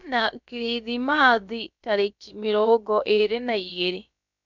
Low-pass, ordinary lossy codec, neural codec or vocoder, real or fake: 7.2 kHz; none; codec, 16 kHz, about 1 kbps, DyCAST, with the encoder's durations; fake